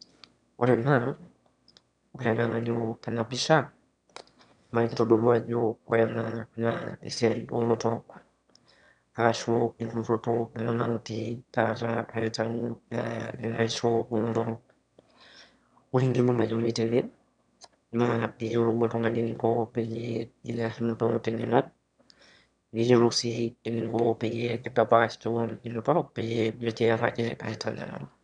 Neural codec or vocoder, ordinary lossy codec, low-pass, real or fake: autoencoder, 22.05 kHz, a latent of 192 numbers a frame, VITS, trained on one speaker; none; 9.9 kHz; fake